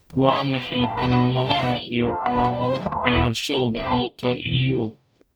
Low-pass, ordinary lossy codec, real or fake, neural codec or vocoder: none; none; fake; codec, 44.1 kHz, 0.9 kbps, DAC